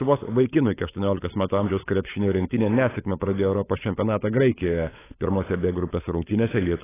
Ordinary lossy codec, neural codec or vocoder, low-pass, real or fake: AAC, 16 kbps; codec, 16 kHz, 16 kbps, FunCodec, trained on LibriTTS, 50 frames a second; 3.6 kHz; fake